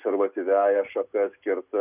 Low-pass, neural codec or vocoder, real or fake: 3.6 kHz; none; real